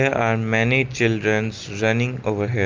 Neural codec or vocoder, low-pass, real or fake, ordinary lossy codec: none; 7.2 kHz; real; Opus, 32 kbps